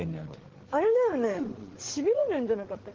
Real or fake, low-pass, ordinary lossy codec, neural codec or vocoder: fake; 7.2 kHz; Opus, 16 kbps; codec, 16 kHz, 8 kbps, FreqCodec, larger model